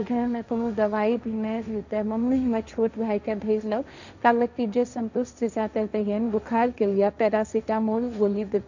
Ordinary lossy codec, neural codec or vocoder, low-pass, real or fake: none; codec, 16 kHz, 1.1 kbps, Voila-Tokenizer; 7.2 kHz; fake